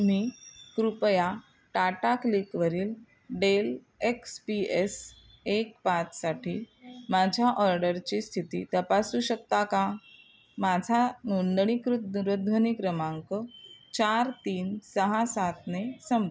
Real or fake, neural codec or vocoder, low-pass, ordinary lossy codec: real; none; none; none